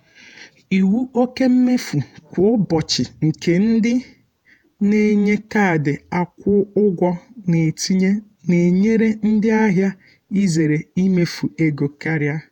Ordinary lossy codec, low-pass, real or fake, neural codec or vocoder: none; 19.8 kHz; fake; vocoder, 48 kHz, 128 mel bands, Vocos